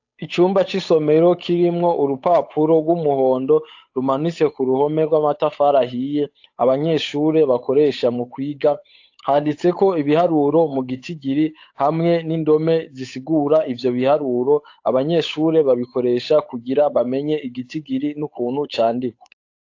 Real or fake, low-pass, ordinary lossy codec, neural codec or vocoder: fake; 7.2 kHz; AAC, 48 kbps; codec, 16 kHz, 8 kbps, FunCodec, trained on Chinese and English, 25 frames a second